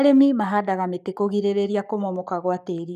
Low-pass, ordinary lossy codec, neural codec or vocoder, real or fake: 14.4 kHz; none; codec, 44.1 kHz, 7.8 kbps, Pupu-Codec; fake